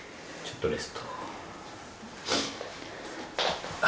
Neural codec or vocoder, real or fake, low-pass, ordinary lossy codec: none; real; none; none